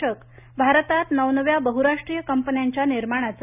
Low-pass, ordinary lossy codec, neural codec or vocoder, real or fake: 3.6 kHz; none; none; real